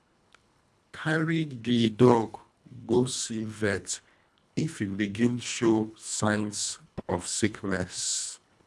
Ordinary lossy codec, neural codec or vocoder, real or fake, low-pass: none; codec, 24 kHz, 1.5 kbps, HILCodec; fake; none